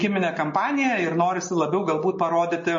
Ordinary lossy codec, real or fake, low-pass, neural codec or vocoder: MP3, 32 kbps; real; 7.2 kHz; none